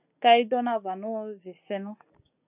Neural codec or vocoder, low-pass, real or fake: none; 3.6 kHz; real